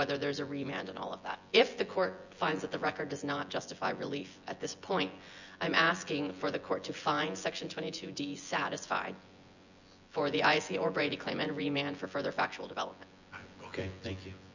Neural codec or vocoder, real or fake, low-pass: vocoder, 24 kHz, 100 mel bands, Vocos; fake; 7.2 kHz